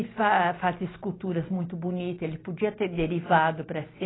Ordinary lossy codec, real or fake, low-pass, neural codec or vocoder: AAC, 16 kbps; real; 7.2 kHz; none